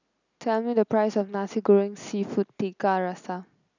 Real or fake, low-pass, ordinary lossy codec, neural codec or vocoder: real; 7.2 kHz; none; none